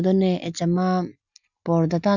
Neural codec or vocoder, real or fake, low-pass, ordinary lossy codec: none; real; 7.2 kHz; none